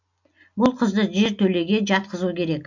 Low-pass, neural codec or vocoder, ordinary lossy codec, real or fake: 7.2 kHz; none; none; real